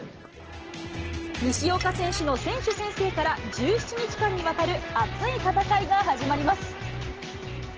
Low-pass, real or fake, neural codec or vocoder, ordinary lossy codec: 7.2 kHz; real; none; Opus, 16 kbps